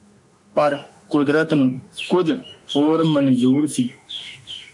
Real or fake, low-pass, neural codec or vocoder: fake; 10.8 kHz; autoencoder, 48 kHz, 32 numbers a frame, DAC-VAE, trained on Japanese speech